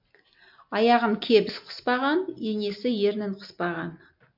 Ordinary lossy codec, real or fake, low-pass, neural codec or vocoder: MP3, 48 kbps; real; 5.4 kHz; none